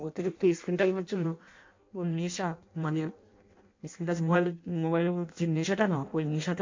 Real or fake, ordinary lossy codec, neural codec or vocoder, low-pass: fake; MP3, 48 kbps; codec, 16 kHz in and 24 kHz out, 0.6 kbps, FireRedTTS-2 codec; 7.2 kHz